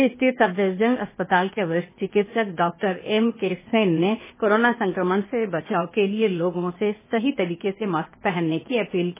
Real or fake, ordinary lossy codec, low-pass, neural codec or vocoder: fake; MP3, 16 kbps; 3.6 kHz; codec, 16 kHz, 0.8 kbps, ZipCodec